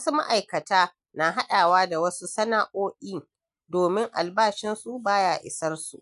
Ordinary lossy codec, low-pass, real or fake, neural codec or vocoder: none; 10.8 kHz; real; none